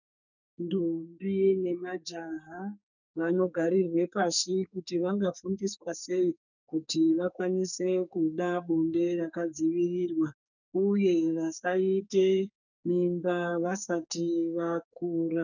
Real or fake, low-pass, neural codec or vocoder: fake; 7.2 kHz; codec, 44.1 kHz, 2.6 kbps, SNAC